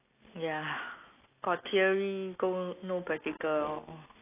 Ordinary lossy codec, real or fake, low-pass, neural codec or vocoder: AAC, 24 kbps; real; 3.6 kHz; none